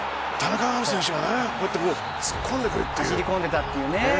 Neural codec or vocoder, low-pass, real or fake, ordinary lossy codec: none; none; real; none